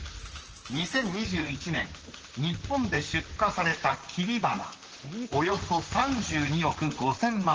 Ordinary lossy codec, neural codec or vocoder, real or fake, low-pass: Opus, 16 kbps; vocoder, 44.1 kHz, 128 mel bands, Pupu-Vocoder; fake; 7.2 kHz